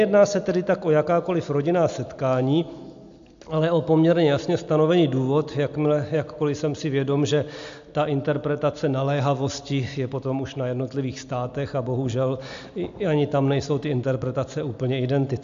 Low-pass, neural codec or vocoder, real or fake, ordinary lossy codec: 7.2 kHz; none; real; AAC, 64 kbps